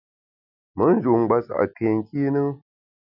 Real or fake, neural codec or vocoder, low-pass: real; none; 5.4 kHz